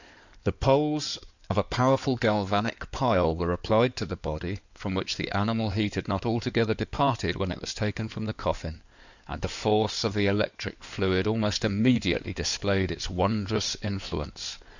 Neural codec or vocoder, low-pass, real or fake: codec, 16 kHz in and 24 kHz out, 2.2 kbps, FireRedTTS-2 codec; 7.2 kHz; fake